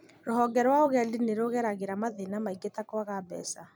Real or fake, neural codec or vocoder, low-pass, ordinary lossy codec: fake; vocoder, 44.1 kHz, 128 mel bands every 256 samples, BigVGAN v2; none; none